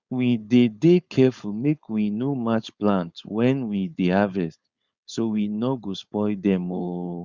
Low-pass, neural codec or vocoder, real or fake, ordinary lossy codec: 7.2 kHz; codec, 16 kHz, 4.8 kbps, FACodec; fake; Opus, 64 kbps